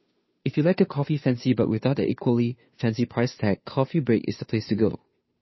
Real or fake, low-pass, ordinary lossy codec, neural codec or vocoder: fake; 7.2 kHz; MP3, 24 kbps; codec, 16 kHz, 2 kbps, FunCodec, trained on Chinese and English, 25 frames a second